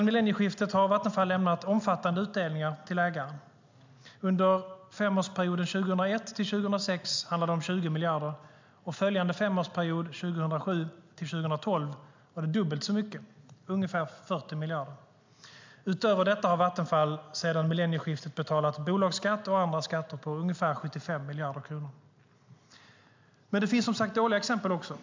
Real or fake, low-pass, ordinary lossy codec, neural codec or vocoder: real; 7.2 kHz; MP3, 64 kbps; none